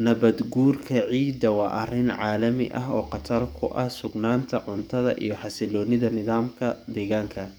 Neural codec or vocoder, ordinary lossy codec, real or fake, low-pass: codec, 44.1 kHz, 7.8 kbps, DAC; none; fake; none